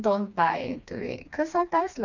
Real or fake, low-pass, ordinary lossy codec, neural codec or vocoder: fake; 7.2 kHz; none; codec, 16 kHz, 2 kbps, FreqCodec, smaller model